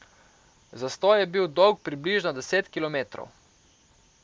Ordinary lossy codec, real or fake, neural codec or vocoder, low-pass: none; real; none; none